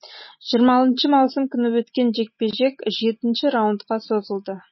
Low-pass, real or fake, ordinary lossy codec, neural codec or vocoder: 7.2 kHz; real; MP3, 24 kbps; none